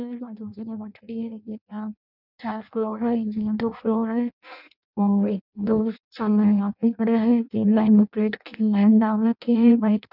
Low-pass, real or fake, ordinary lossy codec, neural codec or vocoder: 5.4 kHz; fake; none; codec, 16 kHz in and 24 kHz out, 0.6 kbps, FireRedTTS-2 codec